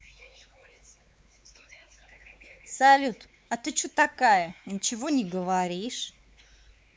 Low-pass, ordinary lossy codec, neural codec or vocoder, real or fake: none; none; codec, 16 kHz, 4 kbps, X-Codec, WavLM features, trained on Multilingual LibriSpeech; fake